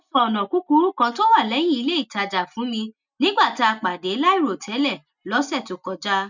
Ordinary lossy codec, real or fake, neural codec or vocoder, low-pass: none; real; none; 7.2 kHz